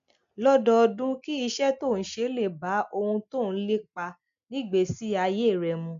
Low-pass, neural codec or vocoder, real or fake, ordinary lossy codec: 7.2 kHz; none; real; MP3, 64 kbps